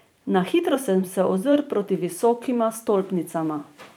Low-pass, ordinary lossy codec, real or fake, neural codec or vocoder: none; none; fake; vocoder, 44.1 kHz, 128 mel bands every 512 samples, BigVGAN v2